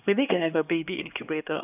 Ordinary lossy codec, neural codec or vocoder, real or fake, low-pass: none; codec, 16 kHz, 2 kbps, X-Codec, HuBERT features, trained on LibriSpeech; fake; 3.6 kHz